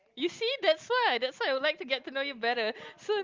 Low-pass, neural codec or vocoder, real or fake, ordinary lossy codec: 7.2 kHz; none; real; Opus, 32 kbps